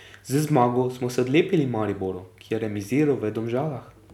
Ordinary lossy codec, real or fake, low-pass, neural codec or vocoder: none; real; 19.8 kHz; none